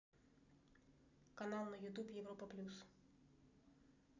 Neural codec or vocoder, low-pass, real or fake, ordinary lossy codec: none; 7.2 kHz; real; none